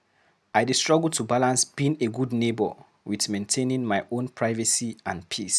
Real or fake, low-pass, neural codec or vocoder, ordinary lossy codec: real; none; none; none